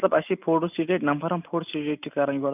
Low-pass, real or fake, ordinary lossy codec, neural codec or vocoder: 3.6 kHz; real; none; none